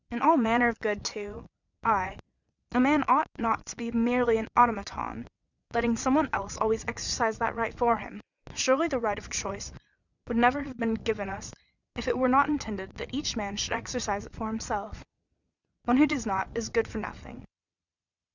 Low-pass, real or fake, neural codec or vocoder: 7.2 kHz; fake; vocoder, 22.05 kHz, 80 mel bands, Vocos